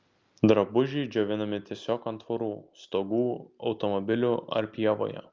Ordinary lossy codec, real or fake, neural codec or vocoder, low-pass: Opus, 24 kbps; real; none; 7.2 kHz